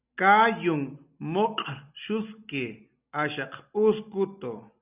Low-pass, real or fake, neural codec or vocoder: 3.6 kHz; real; none